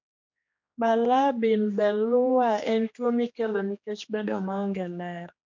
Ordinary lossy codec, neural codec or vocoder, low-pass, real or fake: MP3, 48 kbps; codec, 16 kHz, 2 kbps, X-Codec, HuBERT features, trained on general audio; 7.2 kHz; fake